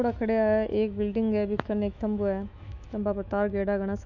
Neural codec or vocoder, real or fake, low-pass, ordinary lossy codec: autoencoder, 48 kHz, 128 numbers a frame, DAC-VAE, trained on Japanese speech; fake; 7.2 kHz; none